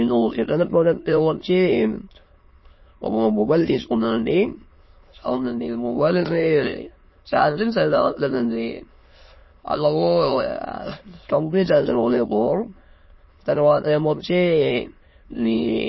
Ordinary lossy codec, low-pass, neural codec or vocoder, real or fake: MP3, 24 kbps; 7.2 kHz; autoencoder, 22.05 kHz, a latent of 192 numbers a frame, VITS, trained on many speakers; fake